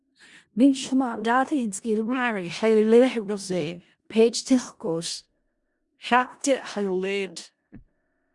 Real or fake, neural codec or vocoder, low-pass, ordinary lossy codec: fake; codec, 16 kHz in and 24 kHz out, 0.4 kbps, LongCat-Audio-Codec, four codebook decoder; 10.8 kHz; Opus, 64 kbps